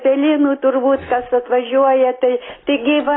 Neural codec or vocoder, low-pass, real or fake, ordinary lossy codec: none; 7.2 kHz; real; AAC, 16 kbps